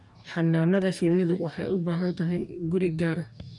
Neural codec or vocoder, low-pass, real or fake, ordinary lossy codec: codec, 44.1 kHz, 2.6 kbps, DAC; 10.8 kHz; fake; none